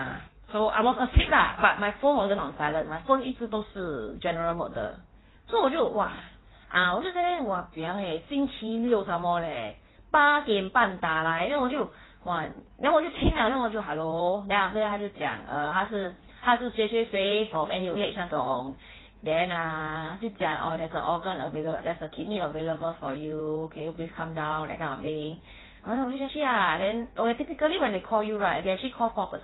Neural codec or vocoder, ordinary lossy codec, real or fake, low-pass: codec, 16 kHz in and 24 kHz out, 1.1 kbps, FireRedTTS-2 codec; AAC, 16 kbps; fake; 7.2 kHz